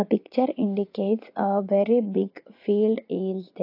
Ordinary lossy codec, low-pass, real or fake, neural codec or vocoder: AAC, 32 kbps; 5.4 kHz; fake; vocoder, 44.1 kHz, 80 mel bands, Vocos